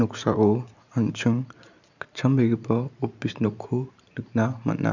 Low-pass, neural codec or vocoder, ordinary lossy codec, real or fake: 7.2 kHz; vocoder, 44.1 kHz, 128 mel bands every 512 samples, BigVGAN v2; none; fake